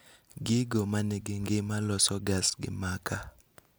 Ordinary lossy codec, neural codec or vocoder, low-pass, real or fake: none; none; none; real